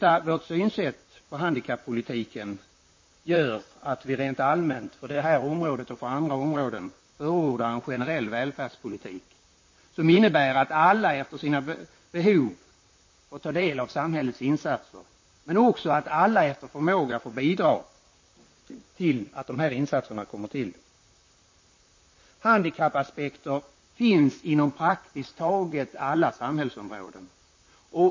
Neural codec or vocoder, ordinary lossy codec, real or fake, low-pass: vocoder, 44.1 kHz, 128 mel bands, Pupu-Vocoder; MP3, 32 kbps; fake; 7.2 kHz